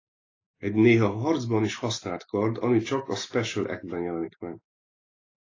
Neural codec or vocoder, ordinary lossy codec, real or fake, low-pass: none; AAC, 32 kbps; real; 7.2 kHz